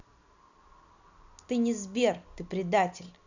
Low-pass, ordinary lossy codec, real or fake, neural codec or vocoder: 7.2 kHz; none; real; none